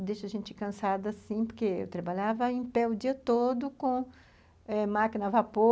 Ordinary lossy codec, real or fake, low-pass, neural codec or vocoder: none; real; none; none